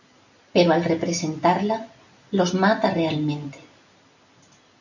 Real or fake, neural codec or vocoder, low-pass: real; none; 7.2 kHz